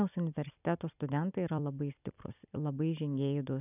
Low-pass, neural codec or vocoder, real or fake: 3.6 kHz; vocoder, 44.1 kHz, 128 mel bands every 256 samples, BigVGAN v2; fake